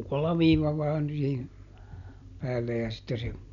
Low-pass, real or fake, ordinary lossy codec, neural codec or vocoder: 7.2 kHz; real; none; none